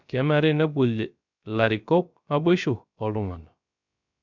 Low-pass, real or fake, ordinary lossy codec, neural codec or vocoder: 7.2 kHz; fake; none; codec, 16 kHz, 0.3 kbps, FocalCodec